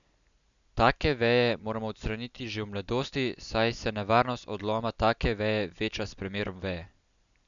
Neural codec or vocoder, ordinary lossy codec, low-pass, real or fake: none; none; 7.2 kHz; real